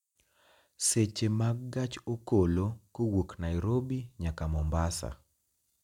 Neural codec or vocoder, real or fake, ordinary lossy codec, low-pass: none; real; none; 19.8 kHz